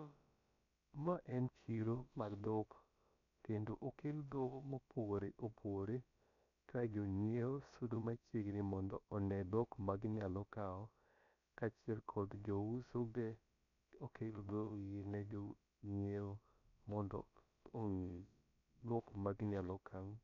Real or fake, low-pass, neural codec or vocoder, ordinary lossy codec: fake; 7.2 kHz; codec, 16 kHz, about 1 kbps, DyCAST, with the encoder's durations; none